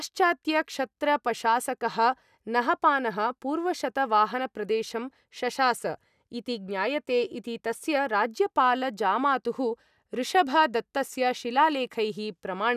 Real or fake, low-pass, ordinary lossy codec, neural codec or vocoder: real; 14.4 kHz; none; none